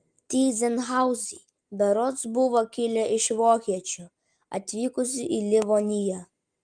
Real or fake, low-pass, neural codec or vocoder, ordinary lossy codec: real; 9.9 kHz; none; Opus, 32 kbps